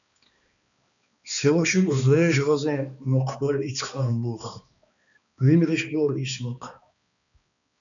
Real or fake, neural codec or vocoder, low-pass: fake; codec, 16 kHz, 2 kbps, X-Codec, HuBERT features, trained on balanced general audio; 7.2 kHz